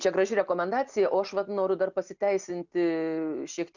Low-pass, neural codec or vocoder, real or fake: 7.2 kHz; none; real